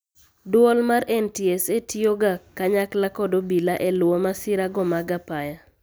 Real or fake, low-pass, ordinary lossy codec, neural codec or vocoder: real; none; none; none